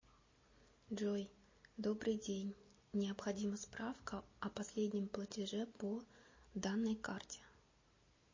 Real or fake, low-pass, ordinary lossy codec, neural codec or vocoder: real; 7.2 kHz; MP3, 32 kbps; none